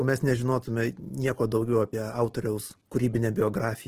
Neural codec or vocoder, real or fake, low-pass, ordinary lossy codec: none; real; 14.4 kHz; Opus, 16 kbps